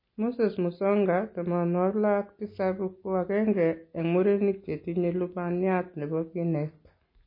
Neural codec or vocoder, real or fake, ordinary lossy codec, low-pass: none; real; MP3, 24 kbps; 5.4 kHz